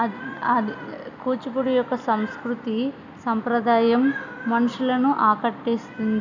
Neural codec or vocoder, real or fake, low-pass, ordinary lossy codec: none; real; 7.2 kHz; none